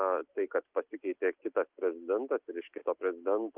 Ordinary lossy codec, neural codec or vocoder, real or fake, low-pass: Opus, 24 kbps; none; real; 3.6 kHz